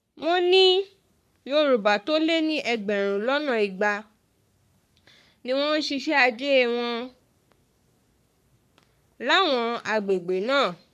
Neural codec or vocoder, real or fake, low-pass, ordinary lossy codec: codec, 44.1 kHz, 3.4 kbps, Pupu-Codec; fake; 14.4 kHz; none